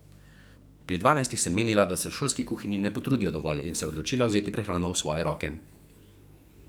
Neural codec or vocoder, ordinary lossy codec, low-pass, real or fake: codec, 44.1 kHz, 2.6 kbps, SNAC; none; none; fake